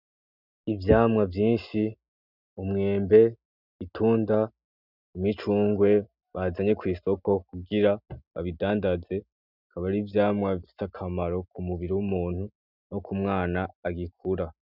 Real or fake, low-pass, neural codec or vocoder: real; 5.4 kHz; none